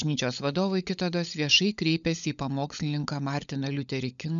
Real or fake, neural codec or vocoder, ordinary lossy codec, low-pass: fake; codec, 16 kHz, 16 kbps, FunCodec, trained on LibriTTS, 50 frames a second; MP3, 96 kbps; 7.2 kHz